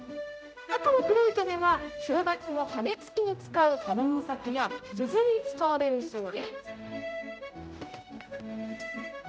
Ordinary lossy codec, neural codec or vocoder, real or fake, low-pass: none; codec, 16 kHz, 0.5 kbps, X-Codec, HuBERT features, trained on general audio; fake; none